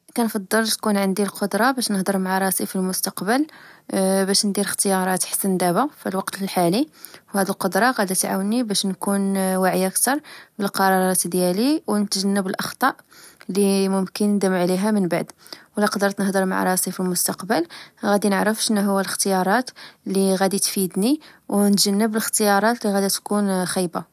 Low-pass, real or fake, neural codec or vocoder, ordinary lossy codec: 14.4 kHz; real; none; none